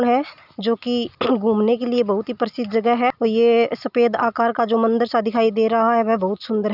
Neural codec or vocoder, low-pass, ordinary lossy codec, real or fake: none; 5.4 kHz; none; real